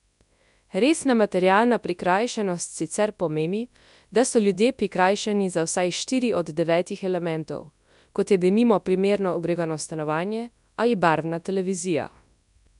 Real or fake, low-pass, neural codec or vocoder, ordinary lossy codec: fake; 10.8 kHz; codec, 24 kHz, 0.9 kbps, WavTokenizer, large speech release; none